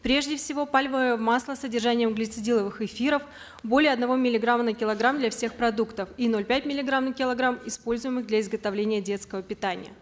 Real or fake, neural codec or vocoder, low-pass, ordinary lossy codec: real; none; none; none